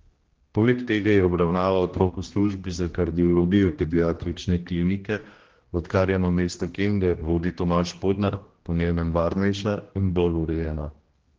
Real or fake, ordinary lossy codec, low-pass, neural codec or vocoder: fake; Opus, 16 kbps; 7.2 kHz; codec, 16 kHz, 1 kbps, X-Codec, HuBERT features, trained on general audio